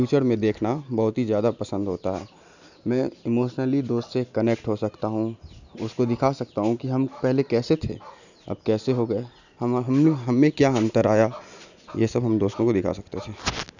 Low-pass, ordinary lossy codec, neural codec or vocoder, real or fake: 7.2 kHz; none; none; real